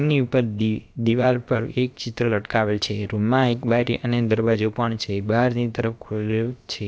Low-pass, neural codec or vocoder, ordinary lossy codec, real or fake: none; codec, 16 kHz, about 1 kbps, DyCAST, with the encoder's durations; none; fake